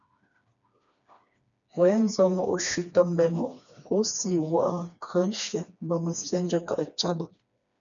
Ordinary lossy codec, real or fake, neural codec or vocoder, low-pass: MP3, 96 kbps; fake; codec, 16 kHz, 2 kbps, FreqCodec, smaller model; 7.2 kHz